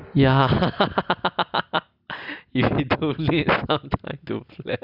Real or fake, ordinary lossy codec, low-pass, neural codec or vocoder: real; none; 5.4 kHz; none